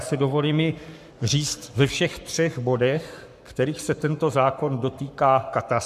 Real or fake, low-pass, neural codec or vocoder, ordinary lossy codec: fake; 14.4 kHz; codec, 44.1 kHz, 7.8 kbps, Pupu-Codec; MP3, 96 kbps